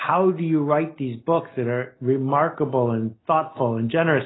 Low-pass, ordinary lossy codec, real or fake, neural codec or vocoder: 7.2 kHz; AAC, 16 kbps; real; none